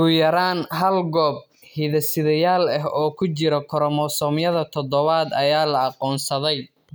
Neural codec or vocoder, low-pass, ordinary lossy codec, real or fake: none; none; none; real